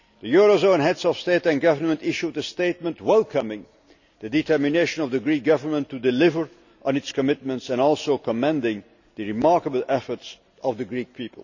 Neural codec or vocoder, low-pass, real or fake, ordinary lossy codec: none; 7.2 kHz; real; none